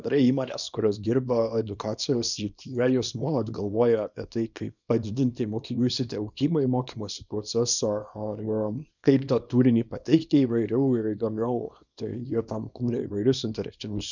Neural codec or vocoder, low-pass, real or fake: codec, 24 kHz, 0.9 kbps, WavTokenizer, small release; 7.2 kHz; fake